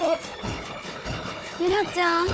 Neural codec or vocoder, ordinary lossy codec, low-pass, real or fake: codec, 16 kHz, 4 kbps, FunCodec, trained on Chinese and English, 50 frames a second; none; none; fake